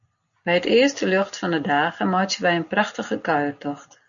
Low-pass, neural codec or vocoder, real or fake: 7.2 kHz; none; real